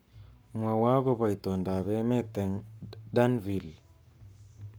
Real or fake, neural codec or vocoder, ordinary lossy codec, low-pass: fake; codec, 44.1 kHz, 7.8 kbps, Pupu-Codec; none; none